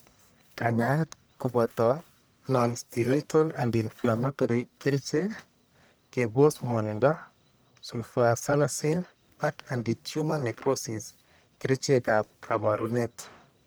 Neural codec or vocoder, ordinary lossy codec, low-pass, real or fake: codec, 44.1 kHz, 1.7 kbps, Pupu-Codec; none; none; fake